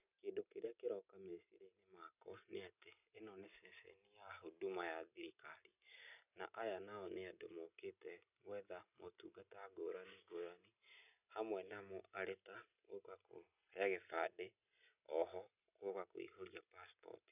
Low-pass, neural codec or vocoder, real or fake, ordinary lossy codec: 3.6 kHz; none; real; none